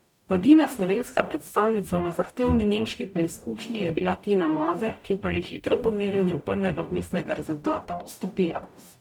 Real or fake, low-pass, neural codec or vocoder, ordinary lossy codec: fake; 19.8 kHz; codec, 44.1 kHz, 0.9 kbps, DAC; none